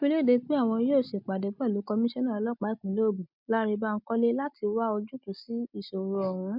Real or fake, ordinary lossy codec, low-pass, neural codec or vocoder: real; MP3, 48 kbps; 5.4 kHz; none